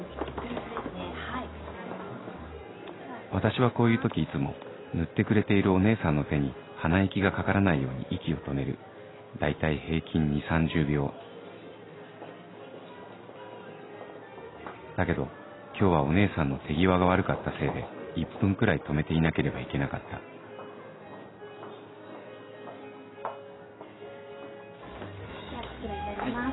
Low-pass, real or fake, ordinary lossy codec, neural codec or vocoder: 7.2 kHz; real; AAC, 16 kbps; none